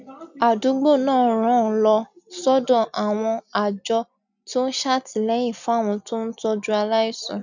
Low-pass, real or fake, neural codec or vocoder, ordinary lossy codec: 7.2 kHz; real; none; none